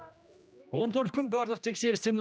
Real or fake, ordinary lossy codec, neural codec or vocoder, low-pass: fake; none; codec, 16 kHz, 1 kbps, X-Codec, HuBERT features, trained on general audio; none